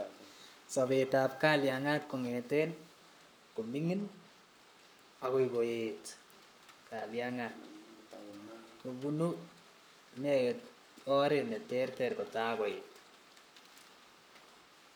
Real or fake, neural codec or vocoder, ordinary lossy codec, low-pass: fake; codec, 44.1 kHz, 7.8 kbps, Pupu-Codec; none; none